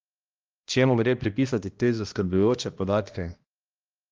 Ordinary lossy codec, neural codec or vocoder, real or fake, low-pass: Opus, 32 kbps; codec, 16 kHz, 1 kbps, X-Codec, HuBERT features, trained on balanced general audio; fake; 7.2 kHz